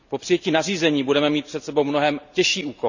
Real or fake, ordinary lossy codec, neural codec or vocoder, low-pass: real; none; none; 7.2 kHz